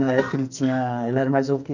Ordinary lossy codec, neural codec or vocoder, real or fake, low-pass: none; codec, 44.1 kHz, 2.6 kbps, SNAC; fake; 7.2 kHz